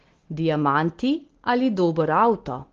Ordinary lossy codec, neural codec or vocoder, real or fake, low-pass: Opus, 16 kbps; none; real; 7.2 kHz